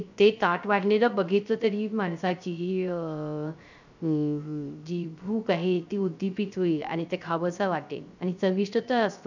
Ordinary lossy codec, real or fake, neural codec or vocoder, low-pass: none; fake; codec, 16 kHz, 0.3 kbps, FocalCodec; 7.2 kHz